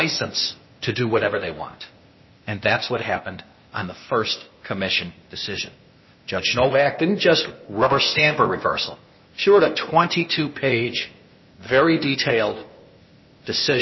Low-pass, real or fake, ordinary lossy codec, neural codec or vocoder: 7.2 kHz; fake; MP3, 24 kbps; codec, 16 kHz, 0.8 kbps, ZipCodec